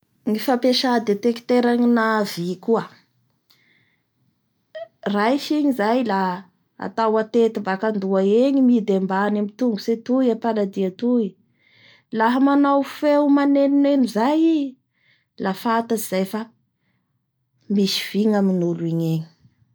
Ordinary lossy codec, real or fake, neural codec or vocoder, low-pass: none; real; none; none